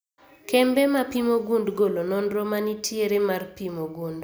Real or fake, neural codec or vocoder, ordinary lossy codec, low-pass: real; none; none; none